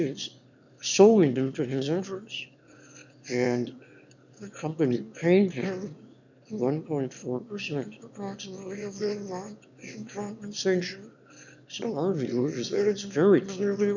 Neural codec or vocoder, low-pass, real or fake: autoencoder, 22.05 kHz, a latent of 192 numbers a frame, VITS, trained on one speaker; 7.2 kHz; fake